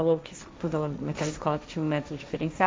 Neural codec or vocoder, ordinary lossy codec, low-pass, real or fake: codec, 16 kHz, 1.1 kbps, Voila-Tokenizer; none; none; fake